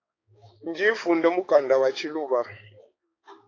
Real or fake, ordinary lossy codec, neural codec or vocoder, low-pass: fake; AAC, 32 kbps; codec, 16 kHz, 4 kbps, X-Codec, HuBERT features, trained on balanced general audio; 7.2 kHz